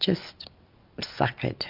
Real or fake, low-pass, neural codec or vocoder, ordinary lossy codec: real; 5.4 kHz; none; MP3, 48 kbps